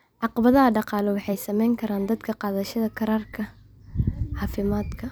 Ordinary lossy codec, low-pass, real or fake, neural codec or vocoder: none; none; fake; vocoder, 44.1 kHz, 128 mel bands every 256 samples, BigVGAN v2